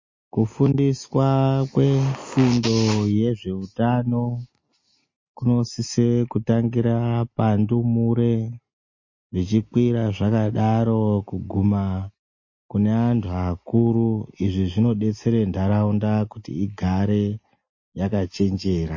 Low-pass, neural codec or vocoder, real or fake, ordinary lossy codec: 7.2 kHz; none; real; MP3, 32 kbps